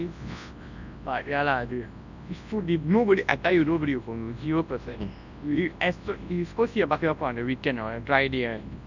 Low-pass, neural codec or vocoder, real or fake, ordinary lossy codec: 7.2 kHz; codec, 24 kHz, 0.9 kbps, WavTokenizer, large speech release; fake; none